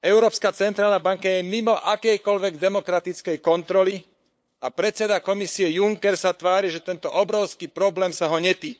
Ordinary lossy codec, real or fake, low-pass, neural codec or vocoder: none; fake; none; codec, 16 kHz, 8 kbps, FunCodec, trained on LibriTTS, 25 frames a second